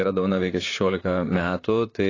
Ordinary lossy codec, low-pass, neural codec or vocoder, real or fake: AAC, 32 kbps; 7.2 kHz; vocoder, 44.1 kHz, 128 mel bands, Pupu-Vocoder; fake